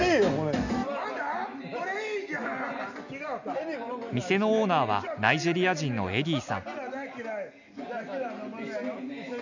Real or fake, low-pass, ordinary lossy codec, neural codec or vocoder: real; 7.2 kHz; none; none